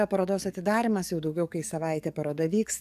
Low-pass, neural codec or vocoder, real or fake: 14.4 kHz; codec, 44.1 kHz, 7.8 kbps, DAC; fake